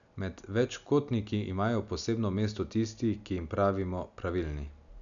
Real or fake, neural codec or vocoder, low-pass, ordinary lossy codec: real; none; 7.2 kHz; none